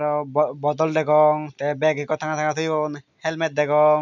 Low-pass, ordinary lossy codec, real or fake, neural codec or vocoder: 7.2 kHz; none; real; none